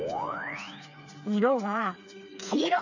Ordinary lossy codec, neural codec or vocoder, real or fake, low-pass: none; codec, 16 kHz, 4 kbps, FreqCodec, smaller model; fake; 7.2 kHz